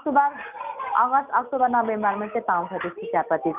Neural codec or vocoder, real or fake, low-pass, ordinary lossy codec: none; real; 3.6 kHz; none